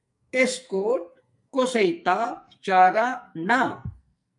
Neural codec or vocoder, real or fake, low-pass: codec, 44.1 kHz, 2.6 kbps, SNAC; fake; 10.8 kHz